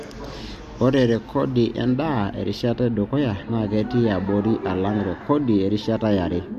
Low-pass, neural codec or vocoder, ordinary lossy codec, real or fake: 14.4 kHz; none; MP3, 64 kbps; real